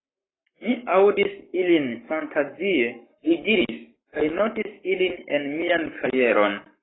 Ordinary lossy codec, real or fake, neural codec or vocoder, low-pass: AAC, 16 kbps; fake; codec, 44.1 kHz, 7.8 kbps, Pupu-Codec; 7.2 kHz